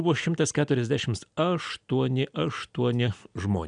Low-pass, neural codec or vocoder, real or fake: 9.9 kHz; none; real